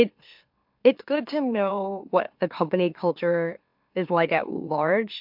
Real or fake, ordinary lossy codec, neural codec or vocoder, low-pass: fake; MP3, 48 kbps; autoencoder, 44.1 kHz, a latent of 192 numbers a frame, MeloTTS; 5.4 kHz